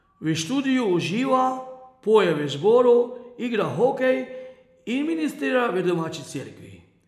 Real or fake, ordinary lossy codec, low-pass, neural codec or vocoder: real; none; 14.4 kHz; none